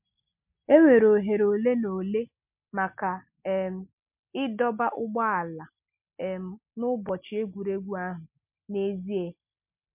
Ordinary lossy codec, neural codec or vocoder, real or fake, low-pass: MP3, 32 kbps; none; real; 3.6 kHz